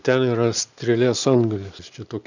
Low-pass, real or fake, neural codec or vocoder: 7.2 kHz; real; none